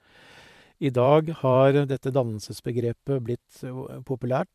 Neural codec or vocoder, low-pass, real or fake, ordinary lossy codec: vocoder, 44.1 kHz, 128 mel bands every 512 samples, BigVGAN v2; 14.4 kHz; fake; MP3, 96 kbps